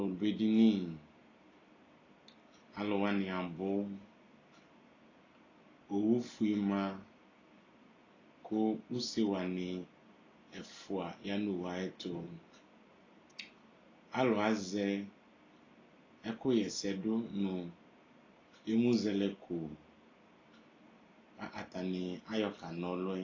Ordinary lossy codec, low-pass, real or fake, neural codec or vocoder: AAC, 32 kbps; 7.2 kHz; real; none